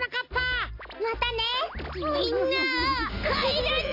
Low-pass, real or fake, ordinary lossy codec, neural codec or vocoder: 5.4 kHz; real; AAC, 32 kbps; none